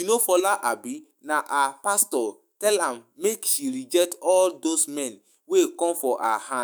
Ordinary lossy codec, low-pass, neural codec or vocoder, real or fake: none; none; autoencoder, 48 kHz, 128 numbers a frame, DAC-VAE, trained on Japanese speech; fake